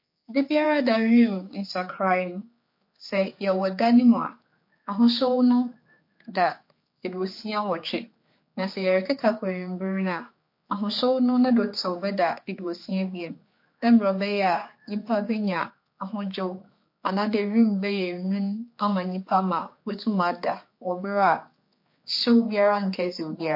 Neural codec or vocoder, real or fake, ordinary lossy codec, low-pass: codec, 16 kHz, 4 kbps, X-Codec, HuBERT features, trained on general audio; fake; MP3, 32 kbps; 5.4 kHz